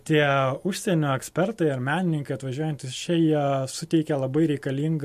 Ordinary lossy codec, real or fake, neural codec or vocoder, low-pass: MP3, 64 kbps; real; none; 14.4 kHz